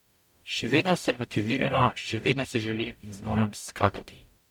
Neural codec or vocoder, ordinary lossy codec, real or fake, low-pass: codec, 44.1 kHz, 0.9 kbps, DAC; none; fake; 19.8 kHz